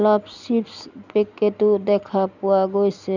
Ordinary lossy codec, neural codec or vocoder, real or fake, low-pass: none; none; real; 7.2 kHz